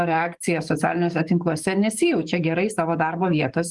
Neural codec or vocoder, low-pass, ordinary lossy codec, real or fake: codec, 44.1 kHz, 7.8 kbps, DAC; 10.8 kHz; Opus, 24 kbps; fake